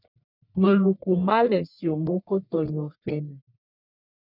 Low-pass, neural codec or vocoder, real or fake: 5.4 kHz; codec, 44.1 kHz, 1.7 kbps, Pupu-Codec; fake